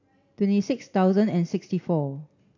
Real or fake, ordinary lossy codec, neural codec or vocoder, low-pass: real; none; none; 7.2 kHz